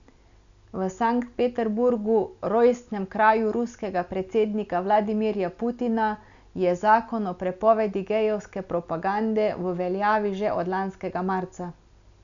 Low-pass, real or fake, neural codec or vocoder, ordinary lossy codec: 7.2 kHz; real; none; none